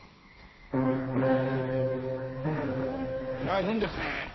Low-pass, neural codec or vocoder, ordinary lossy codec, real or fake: 7.2 kHz; codec, 16 kHz, 1.1 kbps, Voila-Tokenizer; MP3, 24 kbps; fake